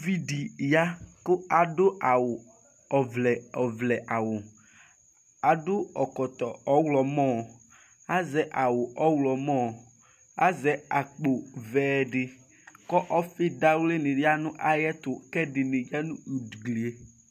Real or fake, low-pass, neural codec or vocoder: real; 14.4 kHz; none